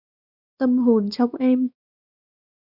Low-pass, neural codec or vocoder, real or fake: 5.4 kHz; codec, 16 kHz, 2 kbps, X-Codec, WavLM features, trained on Multilingual LibriSpeech; fake